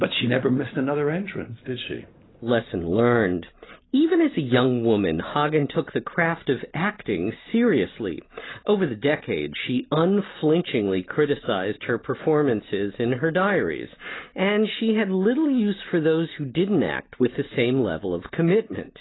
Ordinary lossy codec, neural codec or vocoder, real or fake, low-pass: AAC, 16 kbps; none; real; 7.2 kHz